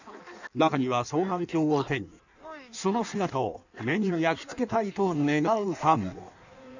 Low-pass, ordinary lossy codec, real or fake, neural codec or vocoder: 7.2 kHz; none; fake; codec, 16 kHz in and 24 kHz out, 1.1 kbps, FireRedTTS-2 codec